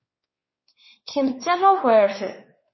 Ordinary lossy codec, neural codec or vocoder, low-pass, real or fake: MP3, 24 kbps; codec, 16 kHz, 2 kbps, X-Codec, WavLM features, trained on Multilingual LibriSpeech; 7.2 kHz; fake